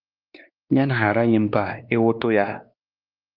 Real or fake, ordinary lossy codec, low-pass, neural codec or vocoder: fake; Opus, 24 kbps; 5.4 kHz; codec, 16 kHz, 2 kbps, X-Codec, WavLM features, trained on Multilingual LibriSpeech